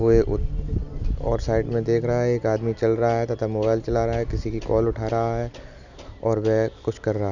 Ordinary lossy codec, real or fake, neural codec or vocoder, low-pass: none; real; none; 7.2 kHz